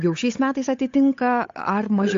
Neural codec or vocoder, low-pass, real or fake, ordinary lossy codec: codec, 16 kHz, 8 kbps, FunCodec, trained on Chinese and English, 25 frames a second; 7.2 kHz; fake; AAC, 48 kbps